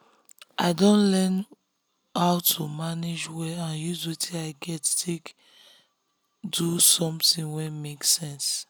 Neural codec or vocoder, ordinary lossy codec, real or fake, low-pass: none; none; real; none